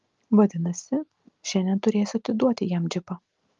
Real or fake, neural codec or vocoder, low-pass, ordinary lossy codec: real; none; 7.2 kHz; Opus, 32 kbps